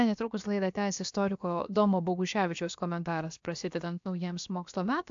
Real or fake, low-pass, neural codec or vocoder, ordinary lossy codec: fake; 7.2 kHz; codec, 16 kHz, about 1 kbps, DyCAST, with the encoder's durations; AAC, 64 kbps